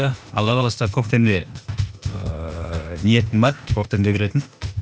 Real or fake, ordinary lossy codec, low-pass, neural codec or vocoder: fake; none; none; codec, 16 kHz, 0.8 kbps, ZipCodec